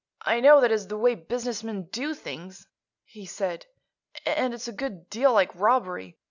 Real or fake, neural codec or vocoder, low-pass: real; none; 7.2 kHz